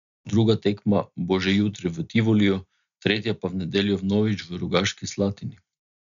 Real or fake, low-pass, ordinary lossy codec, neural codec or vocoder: real; 7.2 kHz; none; none